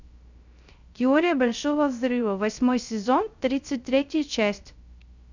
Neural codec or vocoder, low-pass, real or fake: codec, 16 kHz, 0.3 kbps, FocalCodec; 7.2 kHz; fake